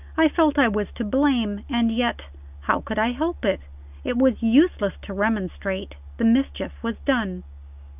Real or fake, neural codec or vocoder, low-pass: real; none; 3.6 kHz